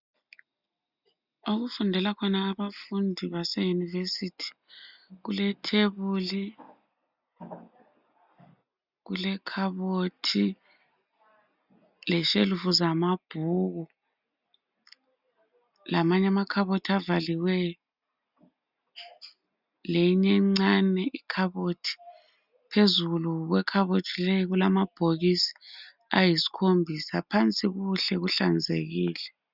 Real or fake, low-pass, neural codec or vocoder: real; 5.4 kHz; none